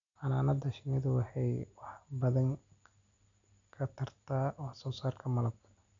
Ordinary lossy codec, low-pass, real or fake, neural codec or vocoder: MP3, 64 kbps; 7.2 kHz; real; none